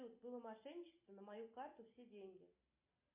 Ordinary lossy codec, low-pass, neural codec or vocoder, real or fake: MP3, 32 kbps; 3.6 kHz; none; real